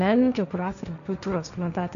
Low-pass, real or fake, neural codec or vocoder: 7.2 kHz; fake; codec, 16 kHz, 1.1 kbps, Voila-Tokenizer